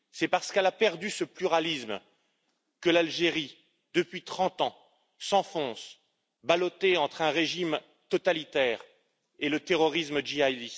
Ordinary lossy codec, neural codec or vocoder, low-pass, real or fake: none; none; none; real